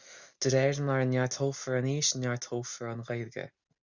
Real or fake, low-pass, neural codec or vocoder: real; 7.2 kHz; none